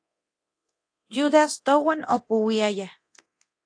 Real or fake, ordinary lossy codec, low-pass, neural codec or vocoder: fake; AAC, 48 kbps; 9.9 kHz; codec, 24 kHz, 0.9 kbps, DualCodec